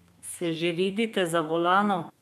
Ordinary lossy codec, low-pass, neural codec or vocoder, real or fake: none; 14.4 kHz; codec, 32 kHz, 1.9 kbps, SNAC; fake